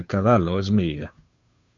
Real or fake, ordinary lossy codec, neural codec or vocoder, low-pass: fake; MP3, 64 kbps; codec, 16 kHz, 2 kbps, FunCodec, trained on Chinese and English, 25 frames a second; 7.2 kHz